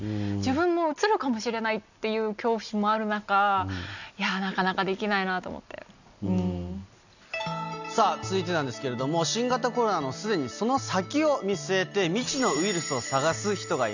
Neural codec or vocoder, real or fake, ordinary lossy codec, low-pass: none; real; none; 7.2 kHz